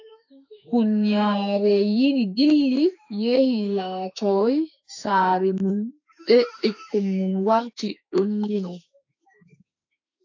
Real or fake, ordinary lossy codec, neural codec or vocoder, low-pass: fake; AAC, 48 kbps; autoencoder, 48 kHz, 32 numbers a frame, DAC-VAE, trained on Japanese speech; 7.2 kHz